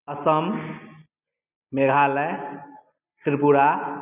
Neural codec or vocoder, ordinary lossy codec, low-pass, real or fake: none; none; 3.6 kHz; real